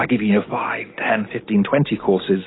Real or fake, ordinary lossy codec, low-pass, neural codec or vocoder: real; AAC, 16 kbps; 7.2 kHz; none